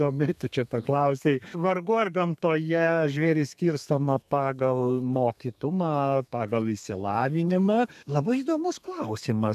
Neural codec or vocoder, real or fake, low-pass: codec, 32 kHz, 1.9 kbps, SNAC; fake; 14.4 kHz